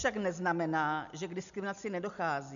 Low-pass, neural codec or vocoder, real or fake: 7.2 kHz; none; real